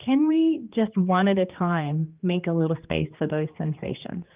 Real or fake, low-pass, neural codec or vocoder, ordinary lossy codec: fake; 3.6 kHz; codec, 16 kHz, 2 kbps, X-Codec, HuBERT features, trained on general audio; Opus, 24 kbps